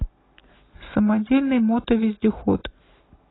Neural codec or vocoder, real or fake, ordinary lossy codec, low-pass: none; real; AAC, 16 kbps; 7.2 kHz